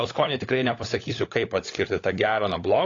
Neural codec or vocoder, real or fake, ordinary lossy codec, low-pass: codec, 16 kHz, 16 kbps, FunCodec, trained on LibriTTS, 50 frames a second; fake; AAC, 32 kbps; 7.2 kHz